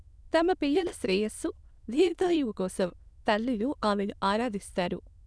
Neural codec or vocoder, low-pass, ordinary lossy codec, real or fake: autoencoder, 22.05 kHz, a latent of 192 numbers a frame, VITS, trained on many speakers; none; none; fake